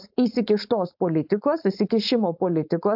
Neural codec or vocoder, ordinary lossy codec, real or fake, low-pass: codec, 16 kHz, 4.8 kbps, FACodec; AAC, 48 kbps; fake; 5.4 kHz